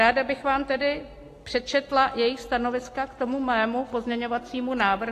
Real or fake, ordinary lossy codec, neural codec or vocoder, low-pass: real; AAC, 48 kbps; none; 14.4 kHz